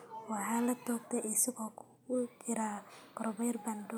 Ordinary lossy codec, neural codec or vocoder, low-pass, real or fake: none; none; none; real